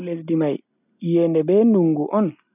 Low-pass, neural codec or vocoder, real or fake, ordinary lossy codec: 3.6 kHz; none; real; none